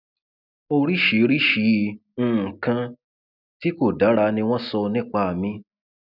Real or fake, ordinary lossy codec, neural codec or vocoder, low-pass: real; none; none; 5.4 kHz